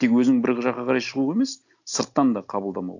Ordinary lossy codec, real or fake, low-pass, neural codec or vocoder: none; real; 7.2 kHz; none